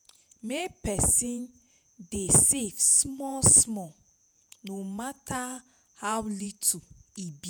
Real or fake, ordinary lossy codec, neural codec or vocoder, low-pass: fake; none; vocoder, 48 kHz, 128 mel bands, Vocos; none